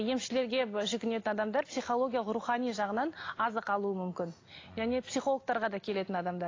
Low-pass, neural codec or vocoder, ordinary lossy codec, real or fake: 7.2 kHz; none; AAC, 32 kbps; real